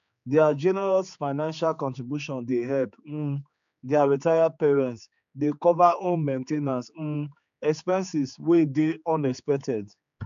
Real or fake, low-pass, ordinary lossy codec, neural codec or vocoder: fake; 7.2 kHz; none; codec, 16 kHz, 4 kbps, X-Codec, HuBERT features, trained on general audio